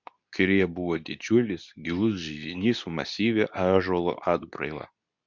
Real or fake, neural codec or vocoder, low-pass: fake; codec, 24 kHz, 0.9 kbps, WavTokenizer, medium speech release version 2; 7.2 kHz